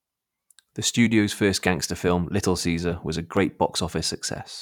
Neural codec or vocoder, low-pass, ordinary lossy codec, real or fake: vocoder, 44.1 kHz, 128 mel bands every 512 samples, BigVGAN v2; 19.8 kHz; none; fake